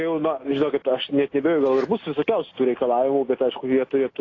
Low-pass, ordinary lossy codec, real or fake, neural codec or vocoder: 7.2 kHz; AAC, 32 kbps; real; none